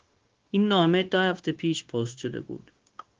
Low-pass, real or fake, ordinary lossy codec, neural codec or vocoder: 7.2 kHz; fake; Opus, 16 kbps; codec, 16 kHz, 0.9 kbps, LongCat-Audio-Codec